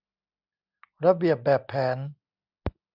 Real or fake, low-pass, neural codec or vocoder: real; 5.4 kHz; none